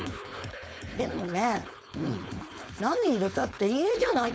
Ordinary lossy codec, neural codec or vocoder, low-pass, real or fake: none; codec, 16 kHz, 4.8 kbps, FACodec; none; fake